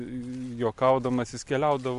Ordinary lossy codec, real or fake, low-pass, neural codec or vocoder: MP3, 64 kbps; real; 10.8 kHz; none